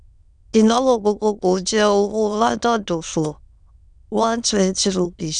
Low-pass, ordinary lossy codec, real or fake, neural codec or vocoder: 9.9 kHz; none; fake; autoencoder, 22.05 kHz, a latent of 192 numbers a frame, VITS, trained on many speakers